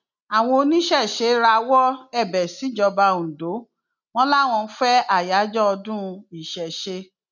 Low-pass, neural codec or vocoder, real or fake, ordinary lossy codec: 7.2 kHz; none; real; none